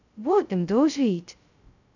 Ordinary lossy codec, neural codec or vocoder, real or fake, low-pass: none; codec, 16 kHz, 0.2 kbps, FocalCodec; fake; 7.2 kHz